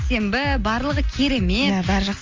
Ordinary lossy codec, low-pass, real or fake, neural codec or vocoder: Opus, 32 kbps; 7.2 kHz; real; none